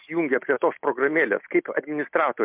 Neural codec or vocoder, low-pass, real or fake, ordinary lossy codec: none; 3.6 kHz; real; MP3, 32 kbps